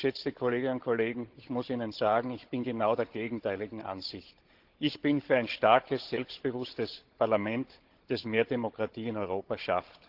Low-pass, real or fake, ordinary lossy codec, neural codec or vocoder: 5.4 kHz; fake; Opus, 16 kbps; codec, 16 kHz, 16 kbps, FunCodec, trained on Chinese and English, 50 frames a second